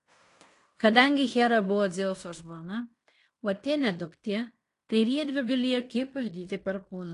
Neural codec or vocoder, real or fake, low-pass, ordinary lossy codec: codec, 16 kHz in and 24 kHz out, 0.9 kbps, LongCat-Audio-Codec, fine tuned four codebook decoder; fake; 10.8 kHz; AAC, 48 kbps